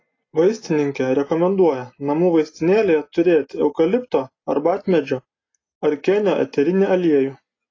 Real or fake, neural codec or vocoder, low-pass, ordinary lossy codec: real; none; 7.2 kHz; AAC, 32 kbps